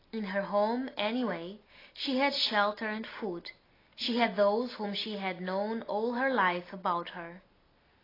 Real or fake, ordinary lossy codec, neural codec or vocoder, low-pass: real; AAC, 24 kbps; none; 5.4 kHz